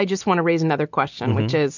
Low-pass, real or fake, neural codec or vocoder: 7.2 kHz; real; none